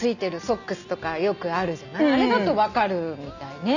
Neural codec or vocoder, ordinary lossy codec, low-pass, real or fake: none; none; 7.2 kHz; real